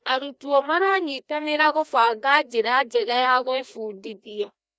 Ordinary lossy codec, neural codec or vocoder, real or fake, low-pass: none; codec, 16 kHz, 1 kbps, FreqCodec, larger model; fake; none